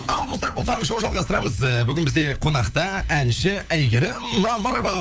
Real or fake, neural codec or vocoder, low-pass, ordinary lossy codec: fake; codec, 16 kHz, 4 kbps, FunCodec, trained on LibriTTS, 50 frames a second; none; none